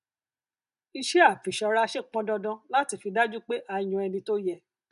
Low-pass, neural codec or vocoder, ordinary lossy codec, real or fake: 10.8 kHz; none; none; real